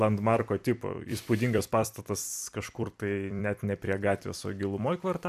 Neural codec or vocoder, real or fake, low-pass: vocoder, 44.1 kHz, 128 mel bands every 256 samples, BigVGAN v2; fake; 14.4 kHz